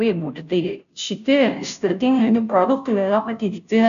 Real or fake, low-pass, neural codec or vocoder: fake; 7.2 kHz; codec, 16 kHz, 0.5 kbps, FunCodec, trained on Chinese and English, 25 frames a second